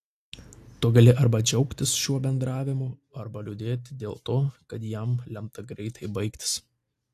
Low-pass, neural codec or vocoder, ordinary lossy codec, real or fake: 14.4 kHz; none; AAC, 64 kbps; real